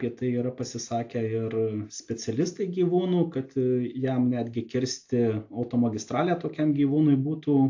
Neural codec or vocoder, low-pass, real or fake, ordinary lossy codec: none; 7.2 kHz; real; AAC, 48 kbps